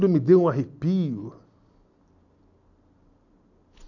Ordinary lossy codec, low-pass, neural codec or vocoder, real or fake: none; 7.2 kHz; none; real